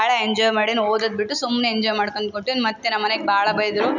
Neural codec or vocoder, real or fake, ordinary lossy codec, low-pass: none; real; none; 7.2 kHz